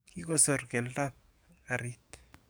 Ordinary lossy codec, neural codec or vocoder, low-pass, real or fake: none; codec, 44.1 kHz, 7.8 kbps, DAC; none; fake